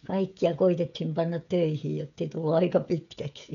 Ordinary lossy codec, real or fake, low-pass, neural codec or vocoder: none; fake; 7.2 kHz; codec, 16 kHz, 16 kbps, FreqCodec, smaller model